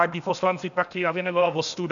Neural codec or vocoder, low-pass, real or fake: codec, 16 kHz, 0.8 kbps, ZipCodec; 7.2 kHz; fake